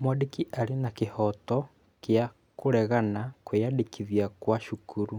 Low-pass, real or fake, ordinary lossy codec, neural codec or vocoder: 19.8 kHz; real; none; none